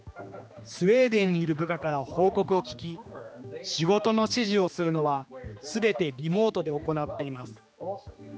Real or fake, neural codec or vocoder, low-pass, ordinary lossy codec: fake; codec, 16 kHz, 2 kbps, X-Codec, HuBERT features, trained on general audio; none; none